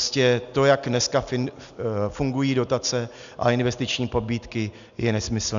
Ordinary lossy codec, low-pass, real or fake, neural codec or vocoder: MP3, 96 kbps; 7.2 kHz; real; none